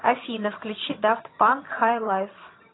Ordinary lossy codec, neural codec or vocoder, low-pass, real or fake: AAC, 16 kbps; vocoder, 22.05 kHz, 80 mel bands, HiFi-GAN; 7.2 kHz; fake